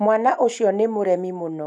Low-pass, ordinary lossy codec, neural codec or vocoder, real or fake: none; none; none; real